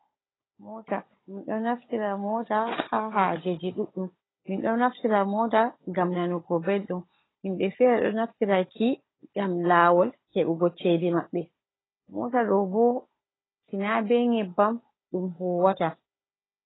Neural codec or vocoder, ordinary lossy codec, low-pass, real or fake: codec, 16 kHz, 16 kbps, FunCodec, trained on Chinese and English, 50 frames a second; AAC, 16 kbps; 7.2 kHz; fake